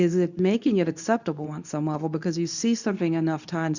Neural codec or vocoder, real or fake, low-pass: codec, 24 kHz, 0.9 kbps, WavTokenizer, medium speech release version 2; fake; 7.2 kHz